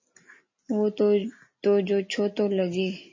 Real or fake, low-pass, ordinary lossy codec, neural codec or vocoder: real; 7.2 kHz; MP3, 32 kbps; none